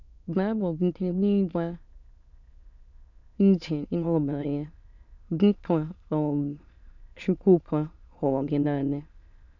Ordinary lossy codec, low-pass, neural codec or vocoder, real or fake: none; 7.2 kHz; autoencoder, 22.05 kHz, a latent of 192 numbers a frame, VITS, trained on many speakers; fake